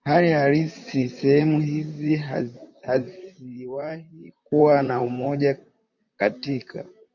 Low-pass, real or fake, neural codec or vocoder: 7.2 kHz; fake; vocoder, 44.1 kHz, 128 mel bands, Pupu-Vocoder